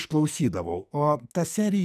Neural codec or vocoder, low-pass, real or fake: codec, 44.1 kHz, 2.6 kbps, SNAC; 14.4 kHz; fake